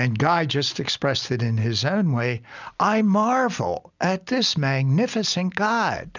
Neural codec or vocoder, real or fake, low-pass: none; real; 7.2 kHz